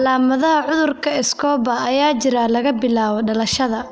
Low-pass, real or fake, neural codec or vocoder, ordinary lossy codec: none; real; none; none